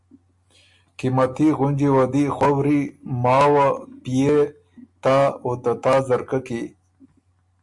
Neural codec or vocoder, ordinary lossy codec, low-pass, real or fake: none; MP3, 64 kbps; 10.8 kHz; real